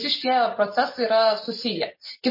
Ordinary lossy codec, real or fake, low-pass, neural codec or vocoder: MP3, 24 kbps; real; 5.4 kHz; none